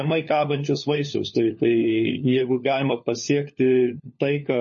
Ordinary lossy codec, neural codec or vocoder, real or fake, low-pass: MP3, 32 kbps; codec, 16 kHz, 4 kbps, FunCodec, trained on LibriTTS, 50 frames a second; fake; 7.2 kHz